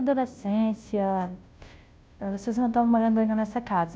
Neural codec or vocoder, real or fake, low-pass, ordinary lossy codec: codec, 16 kHz, 0.5 kbps, FunCodec, trained on Chinese and English, 25 frames a second; fake; none; none